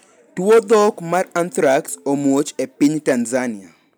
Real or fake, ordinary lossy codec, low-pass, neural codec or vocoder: real; none; none; none